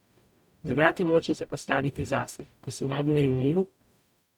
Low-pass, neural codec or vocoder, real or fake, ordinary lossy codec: 19.8 kHz; codec, 44.1 kHz, 0.9 kbps, DAC; fake; none